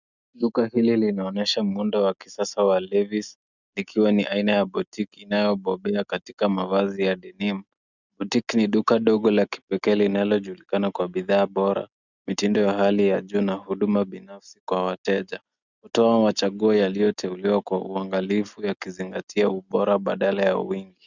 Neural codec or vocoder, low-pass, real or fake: none; 7.2 kHz; real